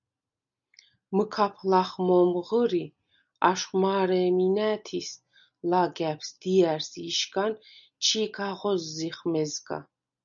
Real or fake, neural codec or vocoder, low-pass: real; none; 7.2 kHz